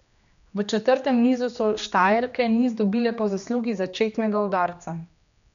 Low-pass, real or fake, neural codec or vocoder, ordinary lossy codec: 7.2 kHz; fake; codec, 16 kHz, 2 kbps, X-Codec, HuBERT features, trained on general audio; none